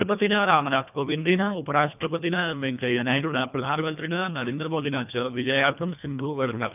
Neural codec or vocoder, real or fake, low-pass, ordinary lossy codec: codec, 24 kHz, 1.5 kbps, HILCodec; fake; 3.6 kHz; none